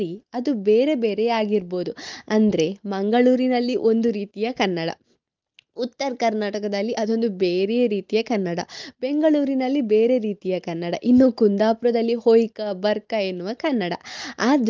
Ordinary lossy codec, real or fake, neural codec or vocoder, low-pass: Opus, 32 kbps; real; none; 7.2 kHz